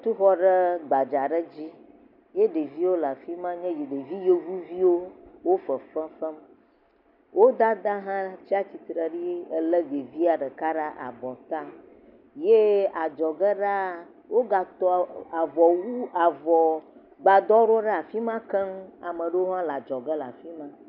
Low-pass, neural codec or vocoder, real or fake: 5.4 kHz; none; real